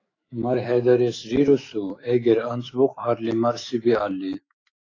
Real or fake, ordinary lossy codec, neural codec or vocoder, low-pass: fake; AAC, 32 kbps; autoencoder, 48 kHz, 128 numbers a frame, DAC-VAE, trained on Japanese speech; 7.2 kHz